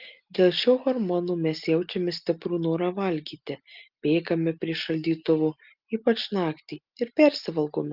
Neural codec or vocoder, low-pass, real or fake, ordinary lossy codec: none; 5.4 kHz; real; Opus, 32 kbps